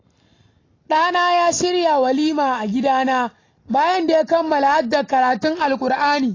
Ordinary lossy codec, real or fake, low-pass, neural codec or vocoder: AAC, 32 kbps; real; 7.2 kHz; none